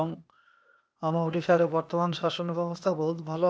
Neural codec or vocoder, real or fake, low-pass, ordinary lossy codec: codec, 16 kHz, 0.8 kbps, ZipCodec; fake; none; none